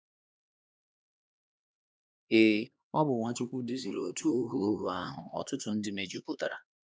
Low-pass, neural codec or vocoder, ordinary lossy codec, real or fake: none; codec, 16 kHz, 2 kbps, X-Codec, HuBERT features, trained on LibriSpeech; none; fake